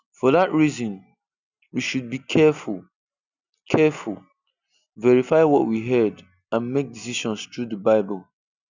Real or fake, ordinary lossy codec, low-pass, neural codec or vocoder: real; none; 7.2 kHz; none